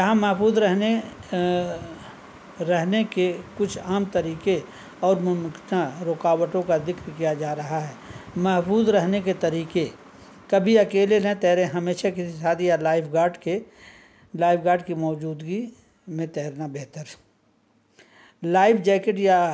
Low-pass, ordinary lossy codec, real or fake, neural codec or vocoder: none; none; real; none